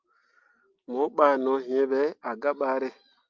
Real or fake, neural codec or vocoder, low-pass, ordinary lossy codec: real; none; 7.2 kHz; Opus, 32 kbps